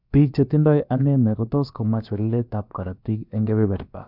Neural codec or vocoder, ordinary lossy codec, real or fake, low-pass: codec, 16 kHz, about 1 kbps, DyCAST, with the encoder's durations; none; fake; 5.4 kHz